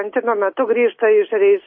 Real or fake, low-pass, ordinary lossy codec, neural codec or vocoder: real; 7.2 kHz; MP3, 24 kbps; none